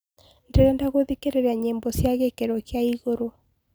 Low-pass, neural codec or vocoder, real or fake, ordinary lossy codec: none; none; real; none